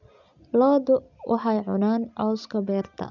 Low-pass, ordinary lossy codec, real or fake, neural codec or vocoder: 7.2 kHz; none; real; none